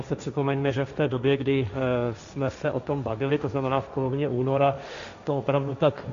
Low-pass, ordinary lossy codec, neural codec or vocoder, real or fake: 7.2 kHz; MP3, 64 kbps; codec, 16 kHz, 1.1 kbps, Voila-Tokenizer; fake